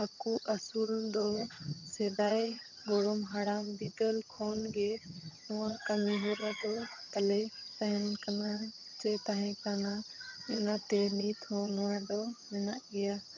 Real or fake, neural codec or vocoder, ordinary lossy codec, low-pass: fake; vocoder, 22.05 kHz, 80 mel bands, HiFi-GAN; none; 7.2 kHz